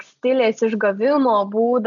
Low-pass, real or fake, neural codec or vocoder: 7.2 kHz; real; none